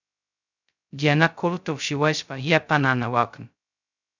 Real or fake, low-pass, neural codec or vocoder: fake; 7.2 kHz; codec, 16 kHz, 0.2 kbps, FocalCodec